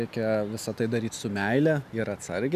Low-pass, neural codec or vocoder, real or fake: 14.4 kHz; none; real